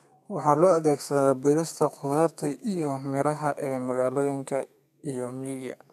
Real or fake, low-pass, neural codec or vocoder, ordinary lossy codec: fake; 14.4 kHz; codec, 32 kHz, 1.9 kbps, SNAC; none